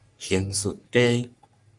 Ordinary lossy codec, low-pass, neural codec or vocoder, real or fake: Opus, 64 kbps; 10.8 kHz; codec, 44.1 kHz, 3.4 kbps, Pupu-Codec; fake